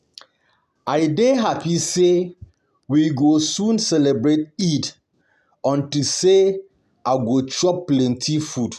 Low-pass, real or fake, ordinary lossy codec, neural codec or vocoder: 14.4 kHz; real; none; none